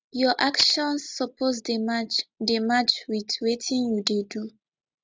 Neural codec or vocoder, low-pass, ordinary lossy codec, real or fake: none; none; none; real